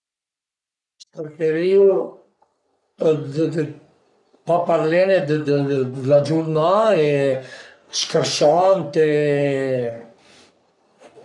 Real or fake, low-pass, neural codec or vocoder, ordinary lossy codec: fake; 10.8 kHz; codec, 44.1 kHz, 3.4 kbps, Pupu-Codec; none